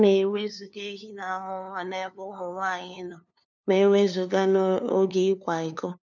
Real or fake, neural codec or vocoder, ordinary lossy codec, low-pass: fake; codec, 16 kHz, 2 kbps, FunCodec, trained on LibriTTS, 25 frames a second; none; 7.2 kHz